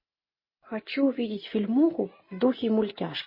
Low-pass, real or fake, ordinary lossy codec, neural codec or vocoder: 5.4 kHz; real; MP3, 24 kbps; none